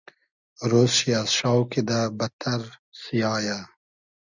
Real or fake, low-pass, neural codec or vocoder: real; 7.2 kHz; none